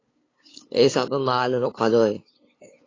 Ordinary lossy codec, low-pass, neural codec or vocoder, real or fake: AAC, 32 kbps; 7.2 kHz; codec, 16 kHz, 2 kbps, FunCodec, trained on LibriTTS, 25 frames a second; fake